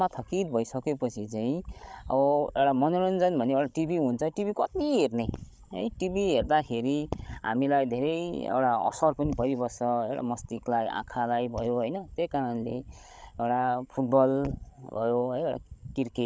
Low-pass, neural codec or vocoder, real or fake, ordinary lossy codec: none; codec, 16 kHz, 16 kbps, FreqCodec, larger model; fake; none